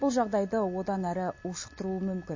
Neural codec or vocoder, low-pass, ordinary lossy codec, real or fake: none; 7.2 kHz; MP3, 32 kbps; real